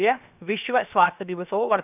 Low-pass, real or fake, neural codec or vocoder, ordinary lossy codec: 3.6 kHz; fake; codec, 16 kHz, 0.7 kbps, FocalCodec; none